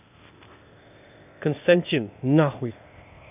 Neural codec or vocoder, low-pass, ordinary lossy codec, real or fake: codec, 16 kHz, 0.8 kbps, ZipCodec; 3.6 kHz; none; fake